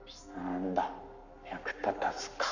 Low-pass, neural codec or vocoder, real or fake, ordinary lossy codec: 7.2 kHz; codec, 44.1 kHz, 7.8 kbps, Pupu-Codec; fake; none